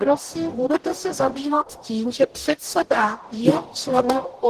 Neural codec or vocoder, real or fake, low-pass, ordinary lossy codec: codec, 44.1 kHz, 0.9 kbps, DAC; fake; 14.4 kHz; Opus, 16 kbps